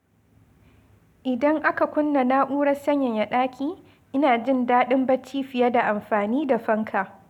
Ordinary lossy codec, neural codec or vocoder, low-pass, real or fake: none; none; 19.8 kHz; real